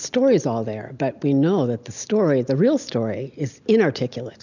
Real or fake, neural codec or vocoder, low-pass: real; none; 7.2 kHz